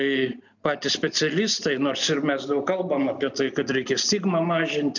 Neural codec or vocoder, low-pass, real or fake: none; 7.2 kHz; real